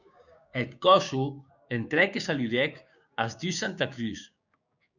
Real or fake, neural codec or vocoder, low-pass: fake; codec, 16 kHz, 6 kbps, DAC; 7.2 kHz